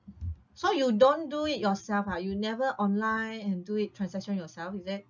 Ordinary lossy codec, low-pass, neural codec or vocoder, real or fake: none; 7.2 kHz; none; real